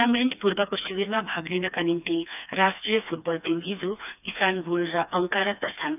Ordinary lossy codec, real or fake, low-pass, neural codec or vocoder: none; fake; 3.6 kHz; codec, 16 kHz, 2 kbps, FreqCodec, smaller model